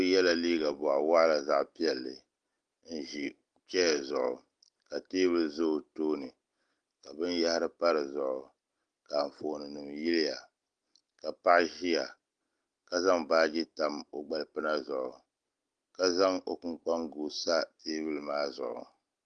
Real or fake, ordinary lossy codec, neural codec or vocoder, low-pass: real; Opus, 24 kbps; none; 9.9 kHz